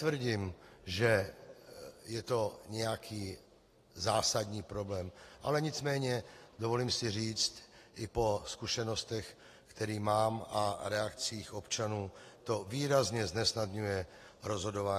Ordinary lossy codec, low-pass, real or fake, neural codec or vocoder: AAC, 48 kbps; 14.4 kHz; real; none